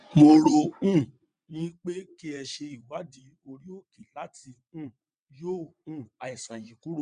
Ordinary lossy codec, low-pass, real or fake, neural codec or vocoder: none; 9.9 kHz; fake; vocoder, 22.05 kHz, 80 mel bands, WaveNeXt